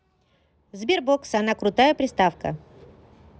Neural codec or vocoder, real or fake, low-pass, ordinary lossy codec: none; real; none; none